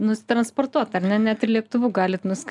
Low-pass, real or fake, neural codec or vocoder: 10.8 kHz; real; none